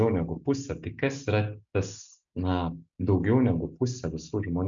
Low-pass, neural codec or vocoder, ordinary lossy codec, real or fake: 7.2 kHz; none; MP3, 64 kbps; real